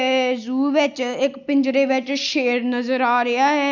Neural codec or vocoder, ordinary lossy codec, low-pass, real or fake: none; none; 7.2 kHz; real